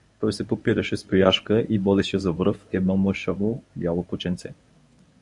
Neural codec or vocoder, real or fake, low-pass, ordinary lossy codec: codec, 24 kHz, 0.9 kbps, WavTokenizer, medium speech release version 1; fake; 10.8 kHz; AAC, 64 kbps